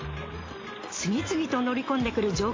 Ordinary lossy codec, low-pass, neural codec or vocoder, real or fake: AAC, 32 kbps; 7.2 kHz; none; real